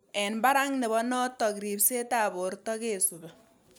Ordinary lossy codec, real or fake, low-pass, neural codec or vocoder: none; real; none; none